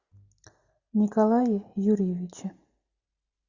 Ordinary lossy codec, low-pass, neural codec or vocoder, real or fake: Opus, 64 kbps; 7.2 kHz; none; real